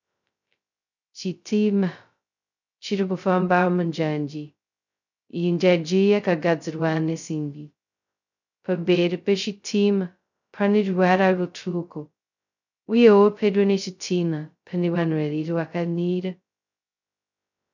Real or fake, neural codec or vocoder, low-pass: fake; codec, 16 kHz, 0.2 kbps, FocalCodec; 7.2 kHz